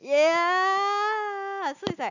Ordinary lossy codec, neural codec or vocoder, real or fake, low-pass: none; none; real; 7.2 kHz